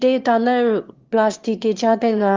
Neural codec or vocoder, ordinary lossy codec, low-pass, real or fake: autoencoder, 22.05 kHz, a latent of 192 numbers a frame, VITS, trained on one speaker; Opus, 32 kbps; 7.2 kHz; fake